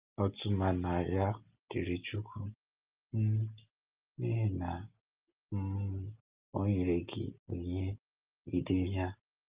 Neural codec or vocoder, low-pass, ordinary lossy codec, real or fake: none; 3.6 kHz; Opus, 32 kbps; real